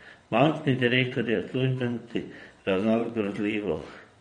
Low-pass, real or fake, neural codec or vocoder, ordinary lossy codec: 9.9 kHz; fake; vocoder, 22.05 kHz, 80 mel bands, WaveNeXt; MP3, 48 kbps